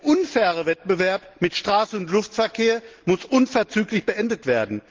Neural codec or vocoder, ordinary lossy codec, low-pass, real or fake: none; Opus, 16 kbps; 7.2 kHz; real